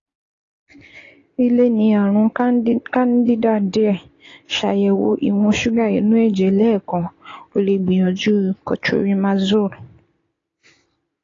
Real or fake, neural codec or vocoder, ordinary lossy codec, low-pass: real; none; AAC, 32 kbps; 7.2 kHz